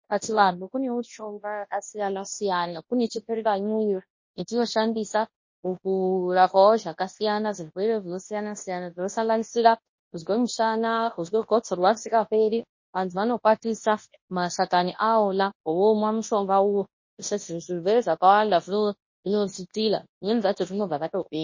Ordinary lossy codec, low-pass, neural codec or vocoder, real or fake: MP3, 32 kbps; 7.2 kHz; codec, 24 kHz, 0.9 kbps, WavTokenizer, large speech release; fake